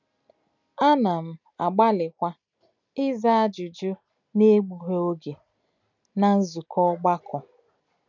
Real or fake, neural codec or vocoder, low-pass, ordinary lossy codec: real; none; 7.2 kHz; none